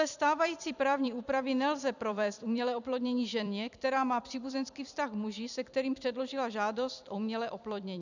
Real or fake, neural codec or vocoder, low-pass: fake; vocoder, 44.1 kHz, 128 mel bands every 256 samples, BigVGAN v2; 7.2 kHz